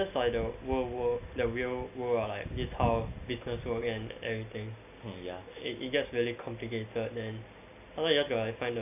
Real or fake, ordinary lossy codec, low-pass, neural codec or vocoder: real; AAC, 32 kbps; 3.6 kHz; none